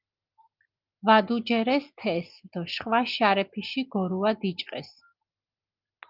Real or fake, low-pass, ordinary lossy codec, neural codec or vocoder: real; 5.4 kHz; Opus, 24 kbps; none